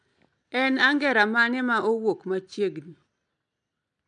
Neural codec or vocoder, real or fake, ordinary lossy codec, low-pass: none; real; none; 9.9 kHz